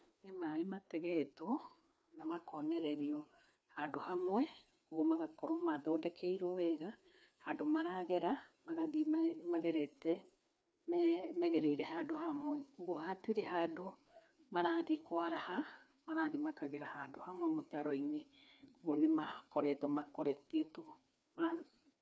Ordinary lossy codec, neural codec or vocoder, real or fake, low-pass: none; codec, 16 kHz, 2 kbps, FreqCodec, larger model; fake; none